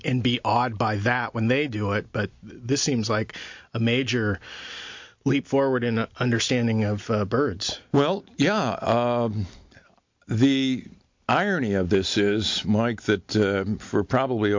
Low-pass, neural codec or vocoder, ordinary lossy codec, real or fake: 7.2 kHz; none; MP3, 48 kbps; real